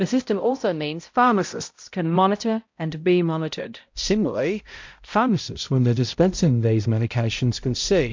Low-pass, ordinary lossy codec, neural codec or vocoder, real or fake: 7.2 kHz; MP3, 48 kbps; codec, 16 kHz, 0.5 kbps, X-Codec, HuBERT features, trained on balanced general audio; fake